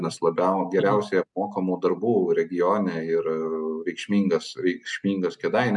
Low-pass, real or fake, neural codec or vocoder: 10.8 kHz; real; none